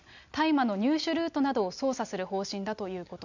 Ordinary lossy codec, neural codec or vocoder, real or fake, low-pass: none; none; real; 7.2 kHz